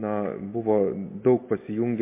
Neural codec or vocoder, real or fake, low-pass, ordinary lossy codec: none; real; 3.6 kHz; MP3, 24 kbps